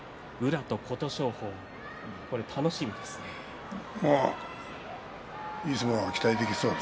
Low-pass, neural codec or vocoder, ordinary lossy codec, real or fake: none; none; none; real